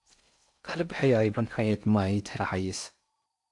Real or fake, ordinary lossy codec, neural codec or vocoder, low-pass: fake; AAC, 64 kbps; codec, 16 kHz in and 24 kHz out, 0.6 kbps, FocalCodec, streaming, 4096 codes; 10.8 kHz